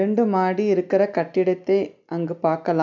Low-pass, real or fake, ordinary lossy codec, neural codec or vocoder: 7.2 kHz; real; none; none